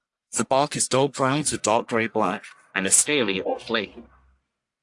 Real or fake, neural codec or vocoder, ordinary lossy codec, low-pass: fake; codec, 44.1 kHz, 1.7 kbps, Pupu-Codec; AAC, 64 kbps; 10.8 kHz